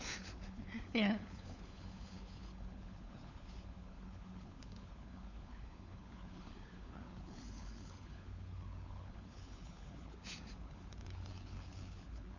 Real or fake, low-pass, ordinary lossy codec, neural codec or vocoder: fake; 7.2 kHz; none; codec, 16 kHz, 4 kbps, FunCodec, trained on LibriTTS, 50 frames a second